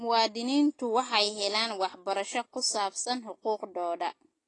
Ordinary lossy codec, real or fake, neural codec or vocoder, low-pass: AAC, 32 kbps; real; none; 10.8 kHz